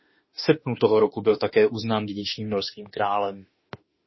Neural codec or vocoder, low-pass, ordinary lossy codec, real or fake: autoencoder, 48 kHz, 32 numbers a frame, DAC-VAE, trained on Japanese speech; 7.2 kHz; MP3, 24 kbps; fake